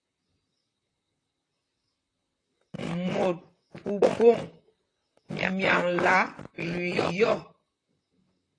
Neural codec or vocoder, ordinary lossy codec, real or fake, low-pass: vocoder, 44.1 kHz, 128 mel bands, Pupu-Vocoder; AAC, 32 kbps; fake; 9.9 kHz